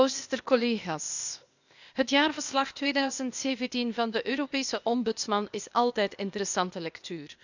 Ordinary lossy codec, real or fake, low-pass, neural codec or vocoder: none; fake; 7.2 kHz; codec, 16 kHz, 0.8 kbps, ZipCodec